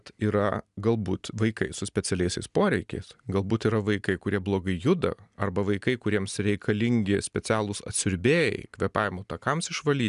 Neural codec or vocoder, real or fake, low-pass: none; real; 10.8 kHz